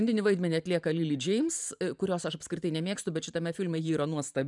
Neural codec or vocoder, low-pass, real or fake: vocoder, 44.1 kHz, 128 mel bands every 512 samples, BigVGAN v2; 10.8 kHz; fake